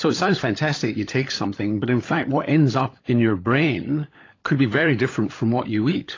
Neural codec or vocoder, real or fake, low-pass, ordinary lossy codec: codec, 16 kHz, 16 kbps, FunCodec, trained on LibriTTS, 50 frames a second; fake; 7.2 kHz; AAC, 32 kbps